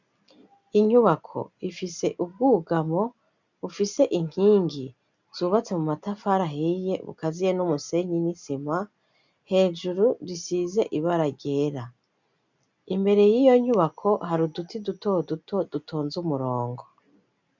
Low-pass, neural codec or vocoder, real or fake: 7.2 kHz; none; real